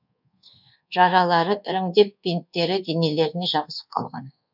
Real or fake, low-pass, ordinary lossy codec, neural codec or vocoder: fake; 5.4 kHz; none; codec, 24 kHz, 1.2 kbps, DualCodec